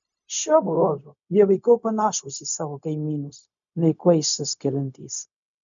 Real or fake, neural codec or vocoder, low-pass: fake; codec, 16 kHz, 0.4 kbps, LongCat-Audio-Codec; 7.2 kHz